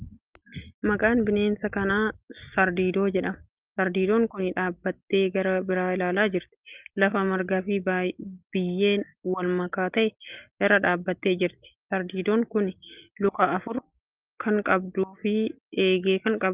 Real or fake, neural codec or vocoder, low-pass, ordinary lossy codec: real; none; 3.6 kHz; Opus, 24 kbps